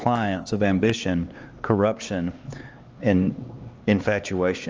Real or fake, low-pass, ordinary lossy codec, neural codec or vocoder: fake; 7.2 kHz; Opus, 24 kbps; codec, 16 kHz, 4 kbps, X-Codec, HuBERT features, trained on LibriSpeech